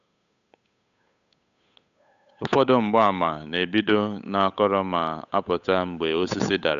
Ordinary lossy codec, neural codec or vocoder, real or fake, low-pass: AAC, 96 kbps; codec, 16 kHz, 8 kbps, FunCodec, trained on Chinese and English, 25 frames a second; fake; 7.2 kHz